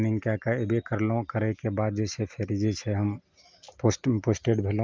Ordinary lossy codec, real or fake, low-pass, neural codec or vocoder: Opus, 24 kbps; real; 7.2 kHz; none